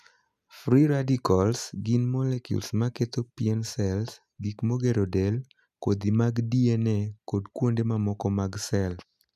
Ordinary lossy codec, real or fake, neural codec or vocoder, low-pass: none; real; none; 14.4 kHz